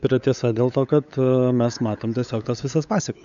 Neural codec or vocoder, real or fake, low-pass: codec, 16 kHz, 8 kbps, FunCodec, trained on LibriTTS, 25 frames a second; fake; 7.2 kHz